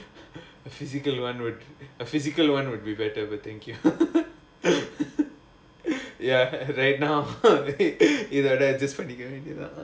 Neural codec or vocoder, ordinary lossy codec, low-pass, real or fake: none; none; none; real